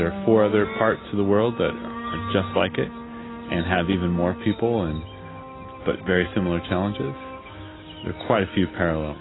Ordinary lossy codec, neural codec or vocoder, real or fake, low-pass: AAC, 16 kbps; none; real; 7.2 kHz